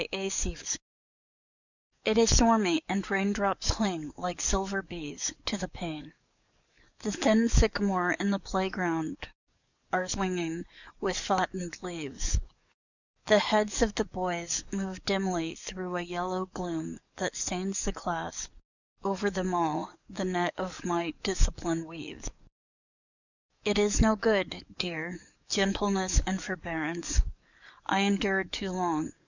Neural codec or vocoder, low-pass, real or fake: codec, 44.1 kHz, 7.8 kbps, DAC; 7.2 kHz; fake